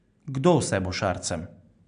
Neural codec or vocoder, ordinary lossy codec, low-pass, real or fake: none; none; 9.9 kHz; real